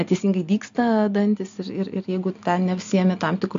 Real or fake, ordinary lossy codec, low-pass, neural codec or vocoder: real; AAC, 64 kbps; 7.2 kHz; none